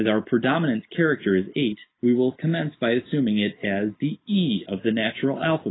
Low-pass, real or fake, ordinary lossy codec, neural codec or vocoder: 7.2 kHz; real; AAC, 16 kbps; none